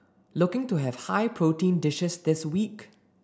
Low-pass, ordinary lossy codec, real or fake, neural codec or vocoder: none; none; real; none